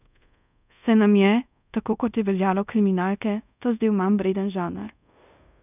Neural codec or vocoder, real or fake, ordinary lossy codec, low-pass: codec, 24 kHz, 0.5 kbps, DualCodec; fake; none; 3.6 kHz